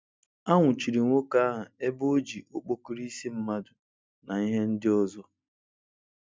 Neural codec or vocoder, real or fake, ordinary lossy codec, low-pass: none; real; none; none